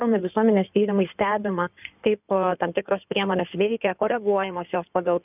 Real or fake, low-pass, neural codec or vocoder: fake; 3.6 kHz; codec, 16 kHz in and 24 kHz out, 2.2 kbps, FireRedTTS-2 codec